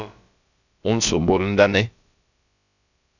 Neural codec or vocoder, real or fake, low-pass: codec, 16 kHz, about 1 kbps, DyCAST, with the encoder's durations; fake; 7.2 kHz